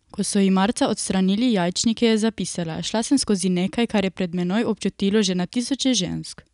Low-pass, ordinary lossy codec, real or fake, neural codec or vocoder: 10.8 kHz; none; real; none